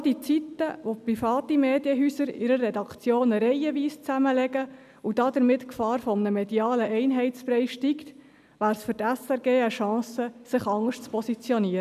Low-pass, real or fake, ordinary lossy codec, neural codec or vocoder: 14.4 kHz; real; none; none